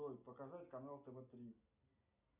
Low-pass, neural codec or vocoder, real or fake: 3.6 kHz; none; real